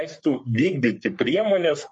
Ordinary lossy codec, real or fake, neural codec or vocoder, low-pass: MP3, 48 kbps; fake; codec, 44.1 kHz, 3.4 kbps, Pupu-Codec; 10.8 kHz